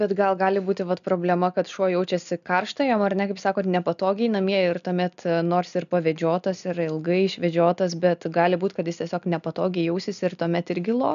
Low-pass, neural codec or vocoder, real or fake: 7.2 kHz; none; real